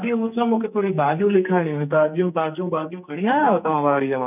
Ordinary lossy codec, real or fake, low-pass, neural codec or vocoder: none; fake; 3.6 kHz; codec, 44.1 kHz, 2.6 kbps, SNAC